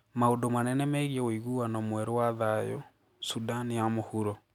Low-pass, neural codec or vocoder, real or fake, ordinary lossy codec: 19.8 kHz; none; real; none